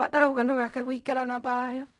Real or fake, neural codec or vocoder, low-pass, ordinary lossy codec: fake; codec, 16 kHz in and 24 kHz out, 0.4 kbps, LongCat-Audio-Codec, fine tuned four codebook decoder; 10.8 kHz; none